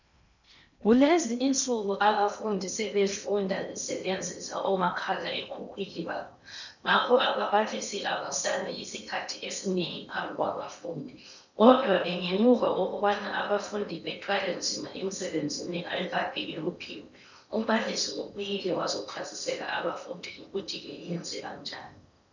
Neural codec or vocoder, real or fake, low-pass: codec, 16 kHz in and 24 kHz out, 0.8 kbps, FocalCodec, streaming, 65536 codes; fake; 7.2 kHz